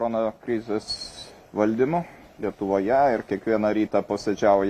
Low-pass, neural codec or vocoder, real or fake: 14.4 kHz; none; real